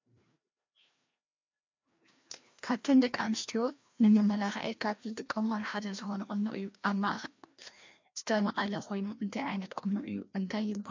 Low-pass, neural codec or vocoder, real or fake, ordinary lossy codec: 7.2 kHz; codec, 16 kHz, 1 kbps, FreqCodec, larger model; fake; MP3, 48 kbps